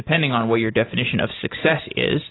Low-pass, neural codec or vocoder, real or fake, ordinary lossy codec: 7.2 kHz; none; real; AAC, 16 kbps